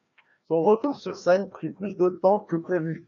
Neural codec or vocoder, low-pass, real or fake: codec, 16 kHz, 1 kbps, FreqCodec, larger model; 7.2 kHz; fake